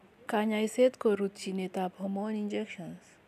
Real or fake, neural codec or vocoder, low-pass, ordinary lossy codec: real; none; 14.4 kHz; none